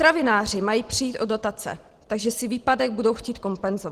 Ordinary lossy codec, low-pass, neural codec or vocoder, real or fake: Opus, 16 kbps; 14.4 kHz; none; real